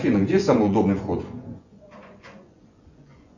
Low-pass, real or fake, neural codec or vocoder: 7.2 kHz; fake; vocoder, 24 kHz, 100 mel bands, Vocos